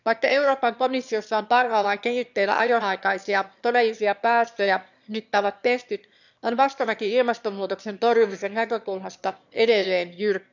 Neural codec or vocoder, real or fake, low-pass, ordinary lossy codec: autoencoder, 22.05 kHz, a latent of 192 numbers a frame, VITS, trained on one speaker; fake; 7.2 kHz; none